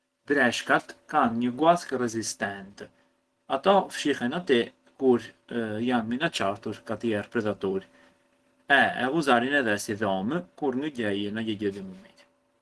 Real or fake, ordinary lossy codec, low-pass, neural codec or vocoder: real; Opus, 16 kbps; 10.8 kHz; none